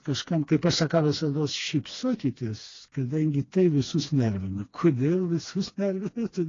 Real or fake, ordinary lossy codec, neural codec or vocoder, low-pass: fake; AAC, 32 kbps; codec, 16 kHz, 2 kbps, FreqCodec, smaller model; 7.2 kHz